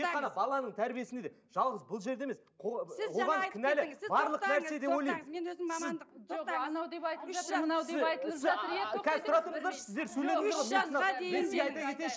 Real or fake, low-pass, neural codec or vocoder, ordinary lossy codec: real; none; none; none